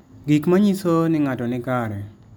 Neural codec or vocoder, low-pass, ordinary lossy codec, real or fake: none; none; none; real